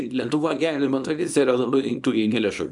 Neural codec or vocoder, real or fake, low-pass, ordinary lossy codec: codec, 24 kHz, 0.9 kbps, WavTokenizer, small release; fake; 10.8 kHz; MP3, 96 kbps